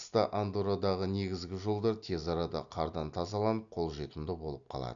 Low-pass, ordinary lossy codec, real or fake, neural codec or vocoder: 7.2 kHz; none; real; none